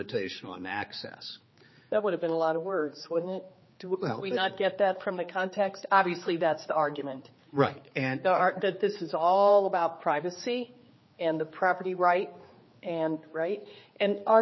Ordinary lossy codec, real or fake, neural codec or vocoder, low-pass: MP3, 24 kbps; fake; codec, 16 kHz, 4 kbps, X-Codec, HuBERT features, trained on general audio; 7.2 kHz